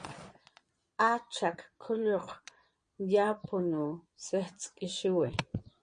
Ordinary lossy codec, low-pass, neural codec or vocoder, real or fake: MP3, 48 kbps; 9.9 kHz; vocoder, 22.05 kHz, 80 mel bands, Vocos; fake